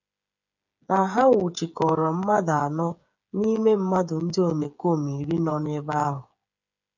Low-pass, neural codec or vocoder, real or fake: 7.2 kHz; codec, 16 kHz, 8 kbps, FreqCodec, smaller model; fake